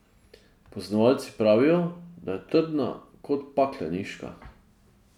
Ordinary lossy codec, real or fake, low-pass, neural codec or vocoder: none; real; 19.8 kHz; none